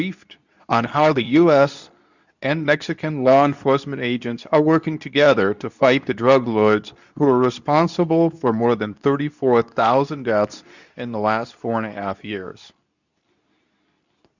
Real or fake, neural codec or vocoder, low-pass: fake; codec, 24 kHz, 0.9 kbps, WavTokenizer, medium speech release version 2; 7.2 kHz